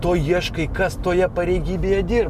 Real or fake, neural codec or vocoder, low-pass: real; none; 14.4 kHz